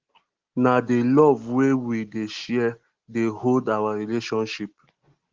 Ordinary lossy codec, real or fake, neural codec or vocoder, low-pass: Opus, 16 kbps; real; none; 7.2 kHz